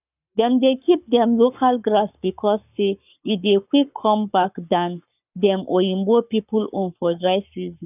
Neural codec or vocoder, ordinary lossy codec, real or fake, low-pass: codec, 44.1 kHz, 7.8 kbps, Pupu-Codec; none; fake; 3.6 kHz